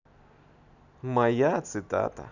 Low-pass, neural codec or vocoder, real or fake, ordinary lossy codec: 7.2 kHz; none; real; none